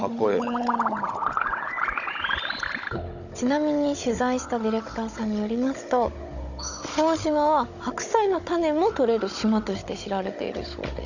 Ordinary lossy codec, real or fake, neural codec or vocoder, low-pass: none; fake; codec, 16 kHz, 16 kbps, FunCodec, trained on Chinese and English, 50 frames a second; 7.2 kHz